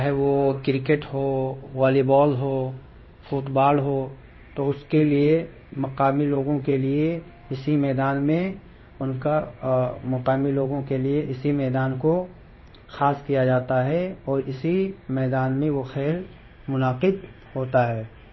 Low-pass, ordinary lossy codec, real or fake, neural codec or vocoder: 7.2 kHz; MP3, 24 kbps; fake; codec, 16 kHz in and 24 kHz out, 1 kbps, XY-Tokenizer